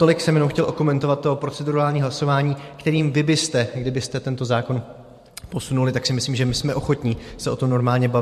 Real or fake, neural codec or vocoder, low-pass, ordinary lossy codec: fake; vocoder, 44.1 kHz, 128 mel bands every 512 samples, BigVGAN v2; 14.4 kHz; MP3, 64 kbps